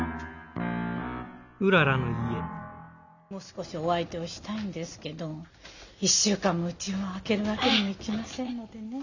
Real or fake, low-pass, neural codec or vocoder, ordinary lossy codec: real; 7.2 kHz; none; none